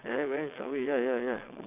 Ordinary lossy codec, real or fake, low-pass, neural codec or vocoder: none; fake; 3.6 kHz; vocoder, 22.05 kHz, 80 mel bands, WaveNeXt